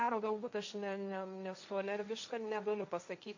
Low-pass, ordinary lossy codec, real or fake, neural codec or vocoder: 7.2 kHz; AAC, 48 kbps; fake; codec, 16 kHz, 1.1 kbps, Voila-Tokenizer